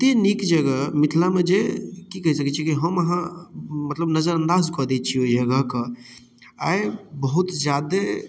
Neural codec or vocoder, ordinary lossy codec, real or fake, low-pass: none; none; real; none